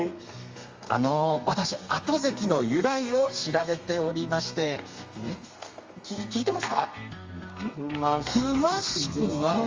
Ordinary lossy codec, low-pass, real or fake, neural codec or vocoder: Opus, 32 kbps; 7.2 kHz; fake; codec, 32 kHz, 1.9 kbps, SNAC